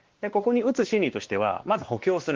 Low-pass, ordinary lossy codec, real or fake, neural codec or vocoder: 7.2 kHz; Opus, 16 kbps; fake; codec, 16 kHz, 2 kbps, X-Codec, WavLM features, trained on Multilingual LibriSpeech